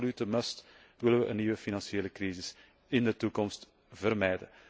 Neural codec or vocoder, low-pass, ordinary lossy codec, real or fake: none; none; none; real